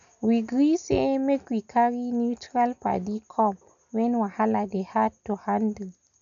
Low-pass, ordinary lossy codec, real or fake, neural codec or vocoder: 7.2 kHz; none; real; none